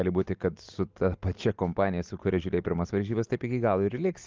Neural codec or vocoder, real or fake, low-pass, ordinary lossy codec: none; real; 7.2 kHz; Opus, 32 kbps